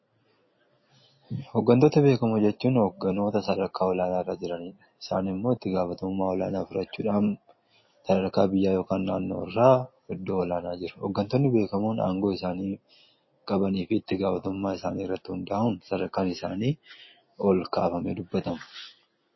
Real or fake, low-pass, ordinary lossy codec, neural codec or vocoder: real; 7.2 kHz; MP3, 24 kbps; none